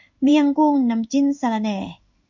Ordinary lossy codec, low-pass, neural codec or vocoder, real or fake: MP3, 64 kbps; 7.2 kHz; codec, 16 kHz in and 24 kHz out, 1 kbps, XY-Tokenizer; fake